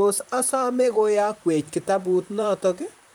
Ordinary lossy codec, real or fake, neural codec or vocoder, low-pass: none; fake; vocoder, 44.1 kHz, 128 mel bands, Pupu-Vocoder; none